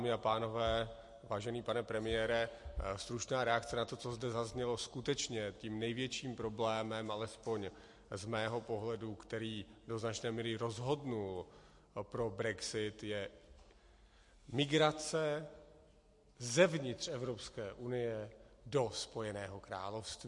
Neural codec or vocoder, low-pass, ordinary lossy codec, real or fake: none; 10.8 kHz; MP3, 48 kbps; real